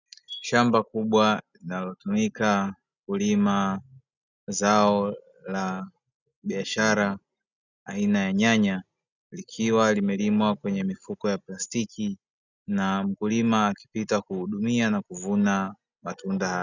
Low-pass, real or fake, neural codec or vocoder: 7.2 kHz; real; none